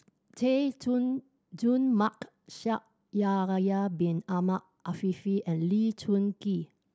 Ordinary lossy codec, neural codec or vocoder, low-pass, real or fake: none; none; none; real